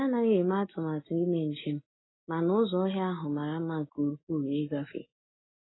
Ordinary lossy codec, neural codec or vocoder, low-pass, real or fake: AAC, 16 kbps; none; 7.2 kHz; real